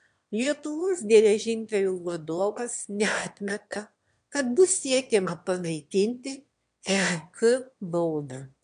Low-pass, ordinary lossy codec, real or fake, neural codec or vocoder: 9.9 kHz; MP3, 64 kbps; fake; autoencoder, 22.05 kHz, a latent of 192 numbers a frame, VITS, trained on one speaker